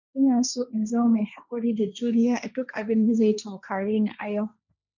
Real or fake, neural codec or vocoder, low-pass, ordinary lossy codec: fake; codec, 16 kHz, 1.1 kbps, Voila-Tokenizer; 7.2 kHz; none